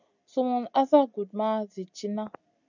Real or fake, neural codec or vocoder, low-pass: real; none; 7.2 kHz